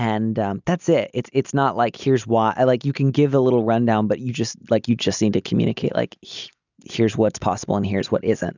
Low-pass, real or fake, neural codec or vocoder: 7.2 kHz; real; none